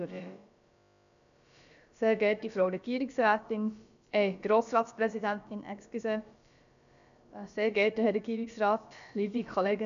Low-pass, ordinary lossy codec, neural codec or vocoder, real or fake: 7.2 kHz; none; codec, 16 kHz, about 1 kbps, DyCAST, with the encoder's durations; fake